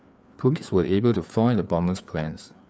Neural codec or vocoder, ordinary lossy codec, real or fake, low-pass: codec, 16 kHz, 4 kbps, FreqCodec, larger model; none; fake; none